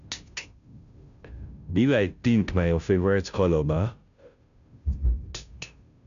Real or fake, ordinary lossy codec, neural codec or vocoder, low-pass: fake; none; codec, 16 kHz, 0.5 kbps, FunCodec, trained on Chinese and English, 25 frames a second; 7.2 kHz